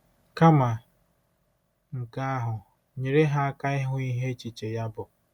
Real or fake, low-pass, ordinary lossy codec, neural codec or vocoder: real; 19.8 kHz; none; none